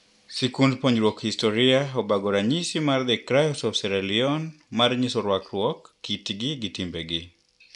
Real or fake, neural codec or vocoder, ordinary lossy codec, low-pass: real; none; none; 10.8 kHz